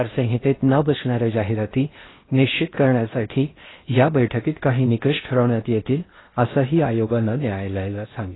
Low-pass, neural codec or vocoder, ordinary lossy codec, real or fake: 7.2 kHz; codec, 16 kHz in and 24 kHz out, 0.6 kbps, FocalCodec, streaming, 4096 codes; AAC, 16 kbps; fake